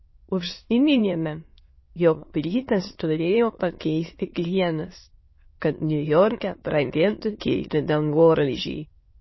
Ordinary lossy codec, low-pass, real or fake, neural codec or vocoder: MP3, 24 kbps; 7.2 kHz; fake; autoencoder, 22.05 kHz, a latent of 192 numbers a frame, VITS, trained on many speakers